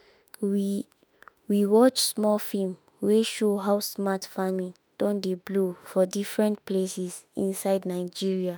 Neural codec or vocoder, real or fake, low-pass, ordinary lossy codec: autoencoder, 48 kHz, 32 numbers a frame, DAC-VAE, trained on Japanese speech; fake; none; none